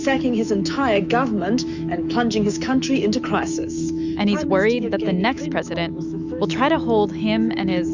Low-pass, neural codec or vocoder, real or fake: 7.2 kHz; autoencoder, 48 kHz, 128 numbers a frame, DAC-VAE, trained on Japanese speech; fake